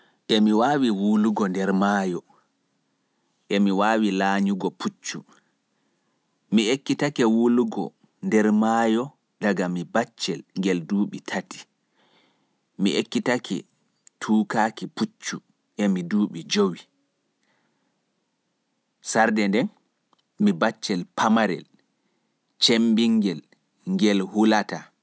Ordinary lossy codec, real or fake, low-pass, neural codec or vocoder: none; real; none; none